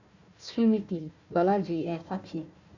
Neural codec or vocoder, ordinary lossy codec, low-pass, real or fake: codec, 16 kHz, 1 kbps, FunCodec, trained on Chinese and English, 50 frames a second; none; 7.2 kHz; fake